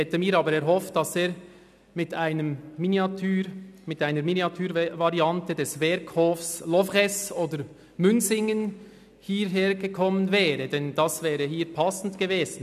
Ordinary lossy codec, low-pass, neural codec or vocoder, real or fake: none; 14.4 kHz; none; real